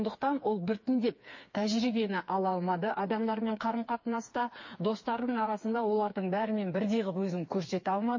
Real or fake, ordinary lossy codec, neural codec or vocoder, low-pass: fake; MP3, 32 kbps; codec, 16 kHz, 4 kbps, FreqCodec, smaller model; 7.2 kHz